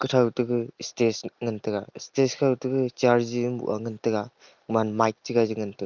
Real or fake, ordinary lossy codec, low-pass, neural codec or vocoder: real; Opus, 32 kbps; 7.2 kHz; none